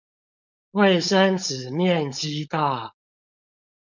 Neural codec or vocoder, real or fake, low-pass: codec, 16 kHz, 4.8 kbps, FACodec; fake; 7.2 kHz